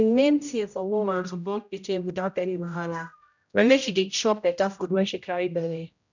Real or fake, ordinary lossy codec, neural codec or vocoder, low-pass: fake; none; codec, 16 kHz, 0.5 kbps, X-Codec, HuBERT features, trained on general audio; 7.2 kHz